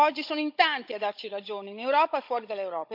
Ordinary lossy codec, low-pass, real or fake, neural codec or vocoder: MP3, 48 kbps; 5.4 kHz; fake; codec, 16 kHz, 16 kbps, FunCodec, trained on Chinese and English, 50 frames a second